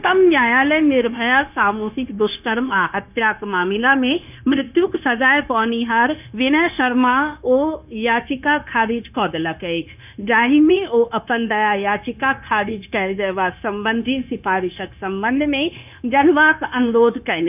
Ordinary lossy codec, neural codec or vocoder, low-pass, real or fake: none; codec, 16 kHz, 0.9 kbps, LongCat-Audio-Codec; 3.6 kHz; fake